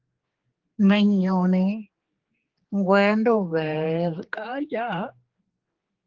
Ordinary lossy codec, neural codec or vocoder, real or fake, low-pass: Opus, 16 kbps; codec, 16 kHz, 4 kbps, X-Codec, HuBERT features, trained on general audio; fake; 7.2 kHz